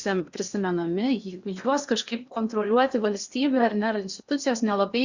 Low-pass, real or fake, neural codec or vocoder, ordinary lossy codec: 7.2 kHz; fake; codec, 16 kHz in and 24 kHz out, 0.8 kbps, FocalCodec, streaming, 65536 codes; Opus, 64 kbps